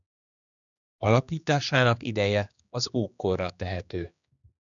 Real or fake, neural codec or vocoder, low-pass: fake; codec, 16 kHz, 2 kbps, X-Codec, HuBERT features, trained on general audio; 7.2 kHz